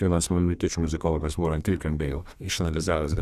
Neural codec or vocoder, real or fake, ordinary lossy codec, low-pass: codec, 32 kHz, 1.9 kbps, SNAC; fake; Opus, 64 kbps; 14.4 kHz